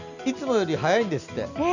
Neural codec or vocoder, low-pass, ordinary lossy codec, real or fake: none; 7.2 kHz; none; real